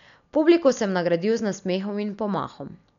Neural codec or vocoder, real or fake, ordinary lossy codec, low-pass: none; real; none; 7.2 kHz